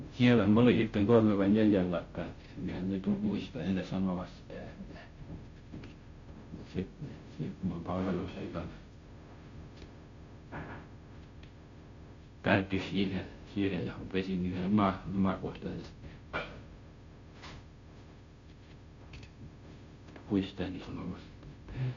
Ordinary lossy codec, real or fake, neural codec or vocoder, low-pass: AAC, 48 kbps; fake; codec, 16 kHz, 0.5 kbps, FunCodec, trained on Chinese and English, 25 frames a second; 7.2 kHz